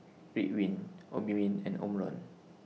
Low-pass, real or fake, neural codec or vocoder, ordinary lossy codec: none; real; none; none